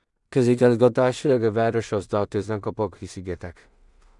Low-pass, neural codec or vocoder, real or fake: 10.8 kHz; codec, 16 kHz in and 24 kHz out, 0.4 kbps, LongCat-Audio-Codec, two codebook decoder; fake